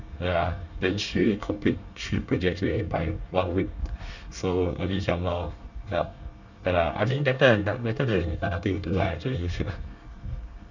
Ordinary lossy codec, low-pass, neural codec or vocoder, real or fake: none; 7.2 kHz; codec, 24 kHz, 1 kbps, SNAC; fake